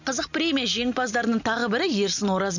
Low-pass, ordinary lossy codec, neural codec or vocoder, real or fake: 7.2 kHz; none; none; real